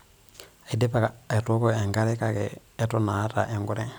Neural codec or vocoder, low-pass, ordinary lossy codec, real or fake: none; none; none; real